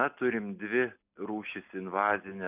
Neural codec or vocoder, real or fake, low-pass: none; real; 3.6 kHz